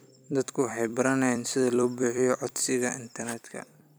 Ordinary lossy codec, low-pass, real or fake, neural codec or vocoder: none; none; fake; vocoder, 44.1 kHz, 128 mel bands every 256 samples, BigVGAN v2